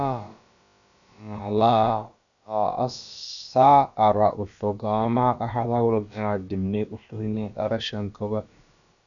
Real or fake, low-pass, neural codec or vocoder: fake; 7.2 kHz; codec, 16 kHz, about 1 kbps, DyCAST, with the encoder's durations